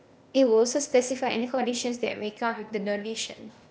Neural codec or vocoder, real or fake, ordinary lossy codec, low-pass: codec, 16 kHz, 0.8 kbps, ZipCodec; fake; none; none